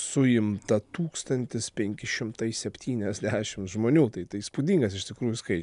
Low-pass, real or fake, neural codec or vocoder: 10.8 kHz; real; none